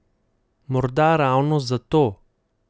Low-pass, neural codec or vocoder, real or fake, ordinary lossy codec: none; none; real; none